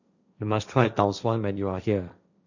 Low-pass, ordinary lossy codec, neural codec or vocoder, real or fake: 7.2 kHz; none; codec, 16 kHz, 1.1 kbps, Voila-Tokenizer; fake